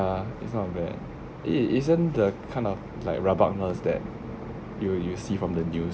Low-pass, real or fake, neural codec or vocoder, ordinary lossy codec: none; real; none; none